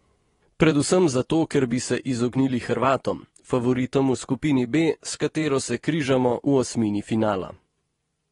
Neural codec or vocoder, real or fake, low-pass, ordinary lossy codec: vocoder, 24 kHz, 100 mel bands, Vocos; fake; 10.8 kHz; AAC, 32 kbps